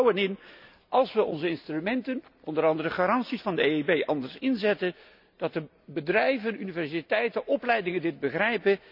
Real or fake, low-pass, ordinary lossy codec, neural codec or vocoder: real; 5.4 kHz; none; none